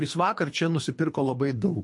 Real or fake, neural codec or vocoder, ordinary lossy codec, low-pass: fake; codec, 24 kHz, 3 kbps, HILCodec; MP3, 48 kbps; 10.8 kHz